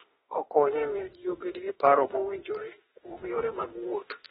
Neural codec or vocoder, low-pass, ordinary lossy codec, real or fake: autoencoder, 48 kHz, 32 numbers a frame, DAC-VAE, trained on Japanese speech; 19.8 kHz; AAC, 16 kbps; fake